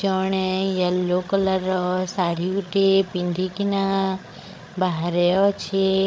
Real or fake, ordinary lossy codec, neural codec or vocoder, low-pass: fake; none; codec, 16 kHz, 8 kbps, FreqCodec, larger model; none